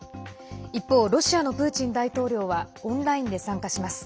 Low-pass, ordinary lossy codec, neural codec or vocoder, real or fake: none; none; none; real